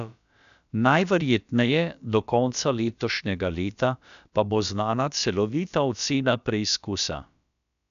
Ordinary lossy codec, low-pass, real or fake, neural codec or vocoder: none; 7.2 kHz; fake; codec, 16 kHz, about 1 kbps, DyCAST, with the encoder's durations